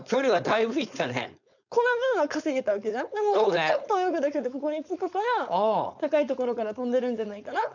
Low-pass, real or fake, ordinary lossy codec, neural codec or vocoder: 7.2 kHz; fake; none; codec, 16 kHz, 4.8 kbps, FACodec